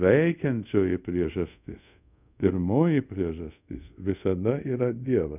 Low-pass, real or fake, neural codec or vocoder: 3.6 kHz; fake; codec, 24 kHz, 0.5 kbps, DualCodec